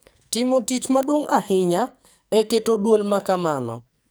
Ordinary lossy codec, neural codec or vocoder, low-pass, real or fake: none; codec, 44.1 kHz, 2.6 kbps, SNAC; none; fake